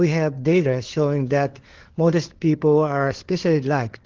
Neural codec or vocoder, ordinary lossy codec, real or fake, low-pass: codec, 16 kHz, 4 kbps, FunCodec, trained on LibriTTS, 50 frames a second; Opus, 16 kbps; fake; 7.2 kHz